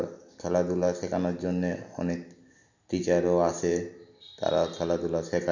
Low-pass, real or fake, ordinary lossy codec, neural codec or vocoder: 7.2 kHz; real; none; none